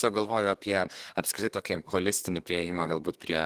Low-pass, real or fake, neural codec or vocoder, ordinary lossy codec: 14.4 kHz; fake; codec, 32 kHz, 1.9 kbps, SNAC; Opus, 24 kbps